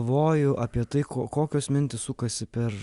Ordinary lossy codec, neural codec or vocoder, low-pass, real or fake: Opus, 64 kbps; none; 10.8 kHz; real